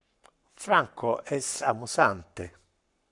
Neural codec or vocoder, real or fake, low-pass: codec, 44.1 kHz, 7.8 kbps, Pupu-Codec; fake; 10.8 kHz